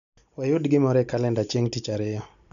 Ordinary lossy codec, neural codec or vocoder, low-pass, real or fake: none; none; 7.2 kHz; real